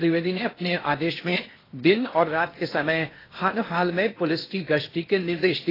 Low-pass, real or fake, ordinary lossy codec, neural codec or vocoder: 5.4 kHz; fake; AAC, 24 kbps; codec, 16 kHz in and 24 kHz out, 0.8 kbps, FocalCodec, streaming, 65536 codes